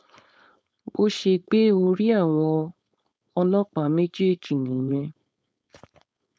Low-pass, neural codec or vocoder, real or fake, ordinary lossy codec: none; codec, 16 kHz, 4.8 kbps, FACodec; fake; none